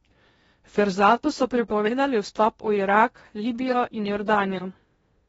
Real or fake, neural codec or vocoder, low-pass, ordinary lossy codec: fake; codec, 16 kHz in and 24 kHz out, 0.6 kbps, FocalCodec, streaming, 2048 codes; 10.8 kHz; AAC, 24 kbps